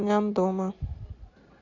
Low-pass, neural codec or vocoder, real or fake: 7.2 kHz; none; real